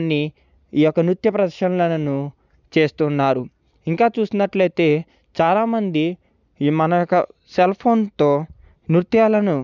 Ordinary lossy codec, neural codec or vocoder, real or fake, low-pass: none; none; real; 7.2 kHz